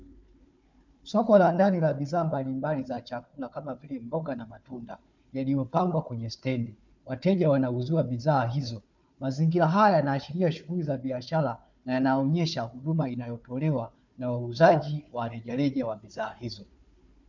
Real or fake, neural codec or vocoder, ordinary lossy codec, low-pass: fake; codec, 16 kHz, 4 kbps, FunCodec, trained on Chinese and English, 50 frames a second; MP3, 64 kbps; 7.2 kHz